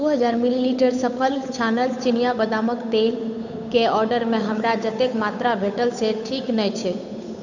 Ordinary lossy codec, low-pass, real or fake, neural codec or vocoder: none; 7.2 kHz; fake; codec, 16 kHz, 8 kbps, FunCodec, trained on Chinese and English, 25 frames a second